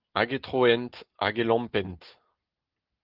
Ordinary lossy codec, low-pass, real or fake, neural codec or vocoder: Opus, 16 kbps; 5.4 kHz; real; none